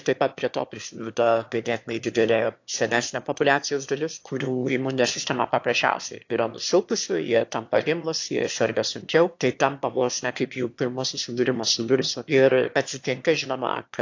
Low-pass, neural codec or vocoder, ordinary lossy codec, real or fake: 7.2 kHz; autoencoder, 22.05 kHz, a latent of 192 numbers a frame, VITS, trained on one speaker; AAC, 48 kbps; fake